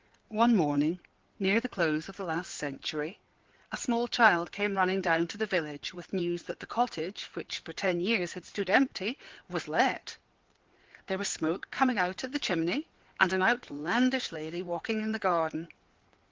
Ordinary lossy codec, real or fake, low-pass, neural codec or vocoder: Opus, 16 kbps; fake; 7.2 kHz; codec, 16 kHz in and 24 kHz out, 2.2 kbps, FireRedTTS-2 codec